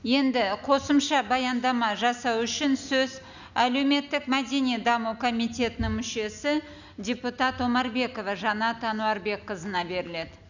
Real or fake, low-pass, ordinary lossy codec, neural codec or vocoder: real; 7.2 kHz; none; none